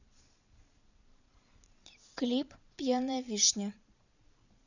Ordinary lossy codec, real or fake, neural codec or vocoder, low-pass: none; fake; vocoder, 22.05 kHz, 80 mel bands, Vocos; 7.2 kHz